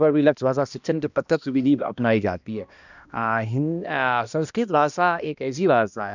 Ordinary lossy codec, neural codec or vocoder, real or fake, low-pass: none; codec, 16 kHz, 1 kbps, X-Codec, HuBERT features, trained on balanced general audio; fake; 7.2 kHz